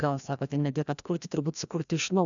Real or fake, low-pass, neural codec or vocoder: fake; 7.2 kHz; codec, 16 kHz, 1 kbps, FreqCodec, larger model